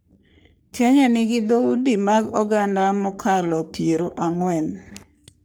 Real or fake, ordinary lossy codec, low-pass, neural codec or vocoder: fake; none; none; codec, 44.1 kHz, 3.4 kbps, Pupu-Codec